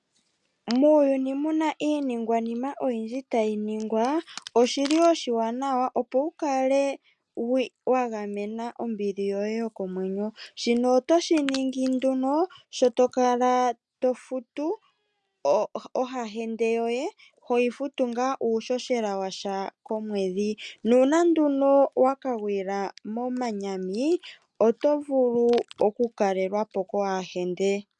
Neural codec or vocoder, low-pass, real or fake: none; 10.8 kHz; real